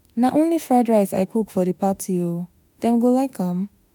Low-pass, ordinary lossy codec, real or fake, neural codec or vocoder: none; none; fake; autoencoder, 48 kHz, 32 numbers a frame, DAC-VAE, trained on Japanese speech